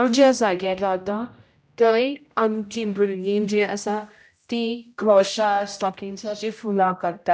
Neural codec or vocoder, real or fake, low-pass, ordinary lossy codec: codec, 16 kHz, 0.5 kbps, X-Codec, HuBERT features, trained on general audio; fake; none; none